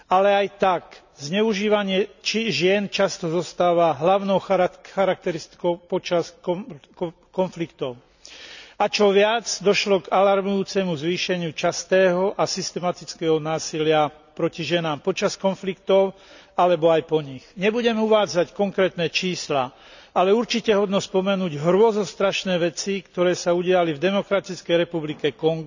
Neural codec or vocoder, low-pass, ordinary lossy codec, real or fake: none; 7.2 kHz; none; real